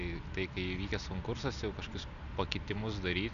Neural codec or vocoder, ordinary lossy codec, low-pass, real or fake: none; MP3, 96 kbps; 7.2 kHz; real